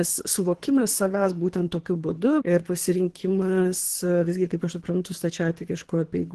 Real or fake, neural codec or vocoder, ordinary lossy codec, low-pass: fake; codec, 24 kHz, 3 kbps, HILCodec; Opus, 16 kbps; 10.8 kHz